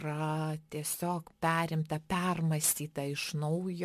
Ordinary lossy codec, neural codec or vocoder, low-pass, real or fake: MP3, 64 kbps; none; 14.4 kHz; real